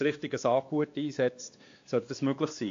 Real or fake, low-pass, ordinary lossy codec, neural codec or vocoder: fake; 7.2 kHz; AAC, 48 kbps; codec, 16 kHz, 2 kbps, X-Codec, WavLM features, trained on Multilingual LibriSpeech